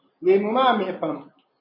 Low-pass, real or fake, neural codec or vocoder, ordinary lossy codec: 5.4 kHz; real; none; MP3, 24 kbps